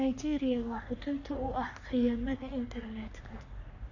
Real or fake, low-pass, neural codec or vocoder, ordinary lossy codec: fake; 7.2 kHz; codec, 44.1 kHz, 3.4 kbps, Pupu-Codec; none